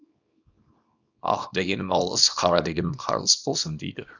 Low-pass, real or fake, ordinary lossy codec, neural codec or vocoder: 7.2 kHz; fake; none; codec, 24 kHz, 0.9 kbps, WavTokenizer, small release